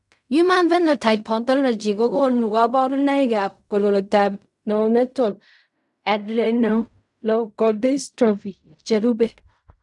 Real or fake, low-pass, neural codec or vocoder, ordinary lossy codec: fake; 10.8 kHz; codec, 16 kHz in and 24 kHz out, 0.4 kbps, LongCat-Audio-Codec, fine tuned four codebook decoder; none